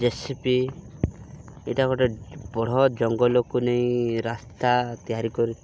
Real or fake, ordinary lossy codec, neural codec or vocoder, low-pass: real; none; none; none